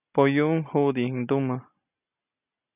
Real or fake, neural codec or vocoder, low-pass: real; none; 3.6 kHz